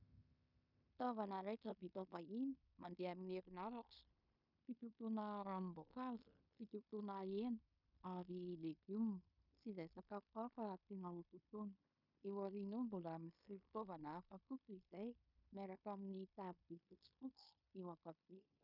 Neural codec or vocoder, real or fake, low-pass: codec, 16 kHz in and 24 kHz out, 0.9 kbps, LongCat-Audio-Codec, fine tuned four codebook decoder; fake; 5.4 kHz